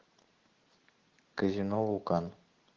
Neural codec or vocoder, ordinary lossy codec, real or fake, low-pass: none; Opus, 16 kbps; real; 7.2 kHz